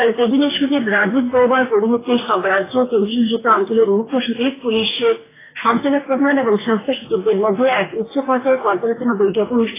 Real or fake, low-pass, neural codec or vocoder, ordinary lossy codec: fake; 3.6 kHz; codec, 44.1 kHz, 2.6 kbps, DAC; AAC, 16 kbps